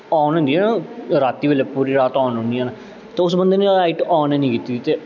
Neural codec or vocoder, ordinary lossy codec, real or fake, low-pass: none; none; real; 7.2 kHz